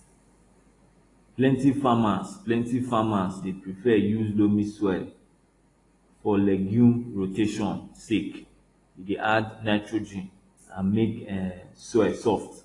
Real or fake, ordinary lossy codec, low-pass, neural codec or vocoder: real; AAC, 32 kbps; 10.8 kHz; none